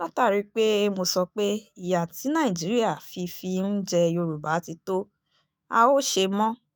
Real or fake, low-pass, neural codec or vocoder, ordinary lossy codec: fake; none; autoencoder, 48 kHz, 128 numbers a frame, DAC-VAE, trained on Japanese speech; none